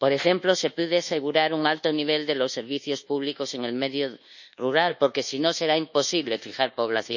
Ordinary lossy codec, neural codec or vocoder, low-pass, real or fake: none; codec, 24 kHz, 1.2 kbps, DualCodec; 7.2 kHz; fake